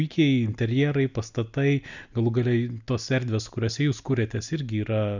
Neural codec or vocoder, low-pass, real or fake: none; 7.2 kHz; real